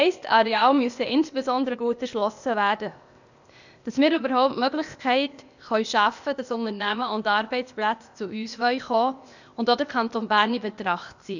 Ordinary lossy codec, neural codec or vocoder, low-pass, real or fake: none; codec, 16 kHz, 0.8 kbps, ZipCodec; 7.2 kHz; fake